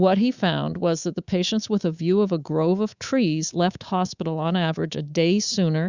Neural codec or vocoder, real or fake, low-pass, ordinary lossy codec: codec, 24 kHz, 3.1 kbps, DualCodec; fake; 7.2 kHz; Opus, 64 kbps